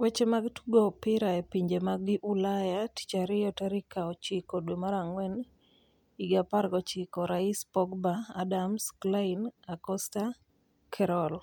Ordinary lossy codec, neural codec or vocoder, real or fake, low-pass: MP3, 96 kbps; none; real; 19.8 kHz